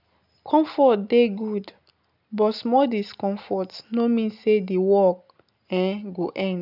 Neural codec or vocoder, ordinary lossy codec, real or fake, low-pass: none; none; real; 5.4 kHz